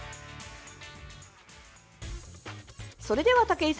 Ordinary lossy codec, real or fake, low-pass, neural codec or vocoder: none; real; none; none